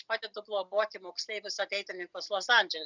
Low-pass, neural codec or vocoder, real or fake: 7.2 kHz; none; real